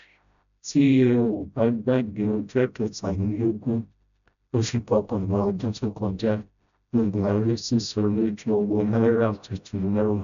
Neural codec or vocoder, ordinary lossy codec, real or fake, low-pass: codec, 16 kHz, 0.5 kbps, FreqCodec, smaller model; none; fake; 7.2 kHz